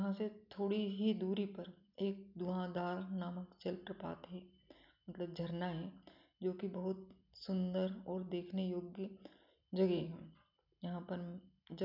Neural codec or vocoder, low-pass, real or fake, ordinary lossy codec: none; 5.4 kHz; real; none